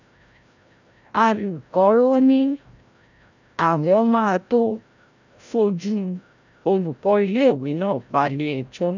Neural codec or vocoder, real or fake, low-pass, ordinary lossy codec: codec, 16 kHz, 0.5 kbps, FreqCodec, larger model; fake; 7.2 kHz; none